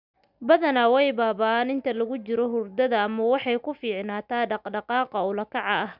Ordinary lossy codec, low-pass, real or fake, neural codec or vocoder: none; 5.4 kHz; real; none